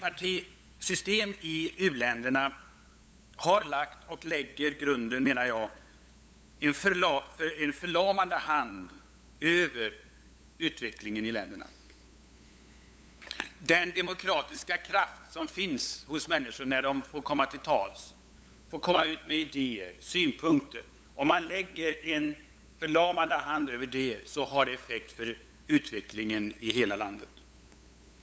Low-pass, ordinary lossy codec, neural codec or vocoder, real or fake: none; none; codec, 16 kHz, 8 kbps, FunCodec, trained on LibriTTS, 25 frames a second; fake